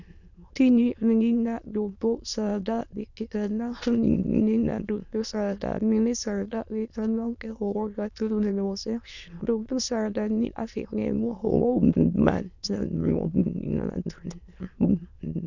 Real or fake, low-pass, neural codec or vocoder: fake; 7.2 kHz; autoencoder, 22.05 kHz, a latent of 192 numbers a frame, VITS, trained on many speakers